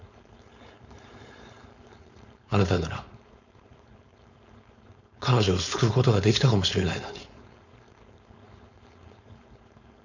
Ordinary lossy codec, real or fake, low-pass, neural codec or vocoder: none; fake; 7.2 kHz; codec, 16 kHz, 4.8 kbps, FACodec